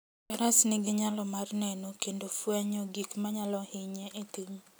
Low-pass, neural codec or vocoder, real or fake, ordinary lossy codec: none; none; real; none